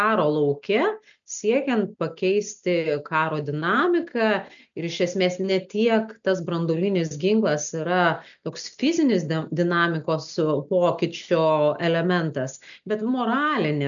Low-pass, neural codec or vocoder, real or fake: 7.2 kHz; none; real